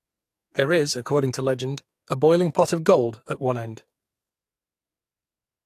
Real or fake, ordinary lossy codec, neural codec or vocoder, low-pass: fake; AAC, 64 kbps; codec, 44.1 kHz, 2.6 kbps, SNAC; 14.4 kHz